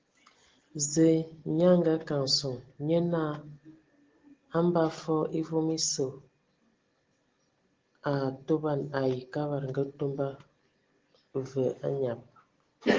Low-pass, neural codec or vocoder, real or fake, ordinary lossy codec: 7.2 kHz; none; real; Opus, 16 kbps